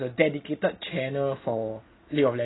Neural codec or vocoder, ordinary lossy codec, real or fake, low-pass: none; AAC, 16 kbps; real; 7.2 kHz